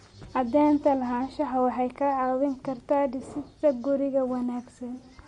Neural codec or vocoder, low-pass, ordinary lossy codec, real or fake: none; 19.8 kHz; MP3, 48 kbps; real